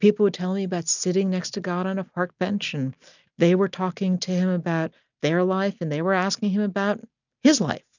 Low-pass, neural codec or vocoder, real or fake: 7.2 kHz; none; real